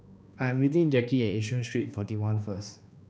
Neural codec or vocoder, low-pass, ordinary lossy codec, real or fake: codec, 16 kHz, 2 kbps, X-Codec, HuBERT features, trained on balanced general audio; none; none; fake